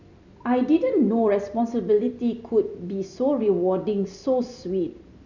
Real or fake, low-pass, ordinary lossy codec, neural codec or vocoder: fake; 7.2 kHz; none; vocoder, 44.1 kHz, 128 mel bands every 256 samples, BigVGAN v2